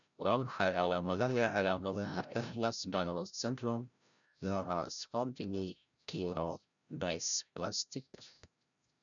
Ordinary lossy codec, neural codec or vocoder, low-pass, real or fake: none; codec, 16 kHz, 0.5 kbps, FreqCodec, larger model; 7.2 kHz; fake